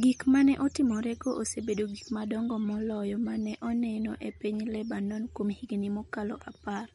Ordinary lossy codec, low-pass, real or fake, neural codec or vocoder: MP3, 48 kbps; 19.8 kHz; real; none